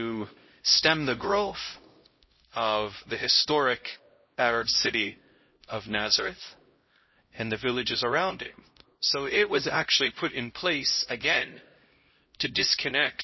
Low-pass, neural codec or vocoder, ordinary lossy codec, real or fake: 7.2 kHz; codec, 16 kHz, 0.5 kbps, X-Codec, HuBERT features, trained on LibriSpeech; MP3, 24 kbps; fake